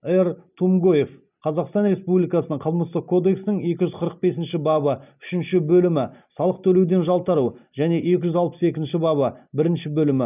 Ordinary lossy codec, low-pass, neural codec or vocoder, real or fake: none; 3.6 kHz; none; real